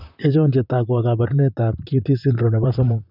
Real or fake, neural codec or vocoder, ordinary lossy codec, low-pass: fake; vocoder, 44.1 kHz, 128 mel bands, Pupu-Vocoder; none; 5.4 kHz